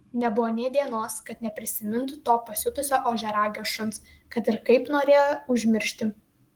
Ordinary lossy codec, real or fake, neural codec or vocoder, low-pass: Opus, 24 kbps; fake; codec, 44.1 kHz, 7.8 kbps, Pupu-Codec; 19.8 kHz